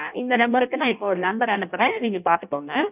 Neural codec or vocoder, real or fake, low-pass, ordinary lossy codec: codec, 16 kHz in and 24 kHz out, 0.6 kbps, FireRedTTS-2 codec; fake; 3.6 kHz; none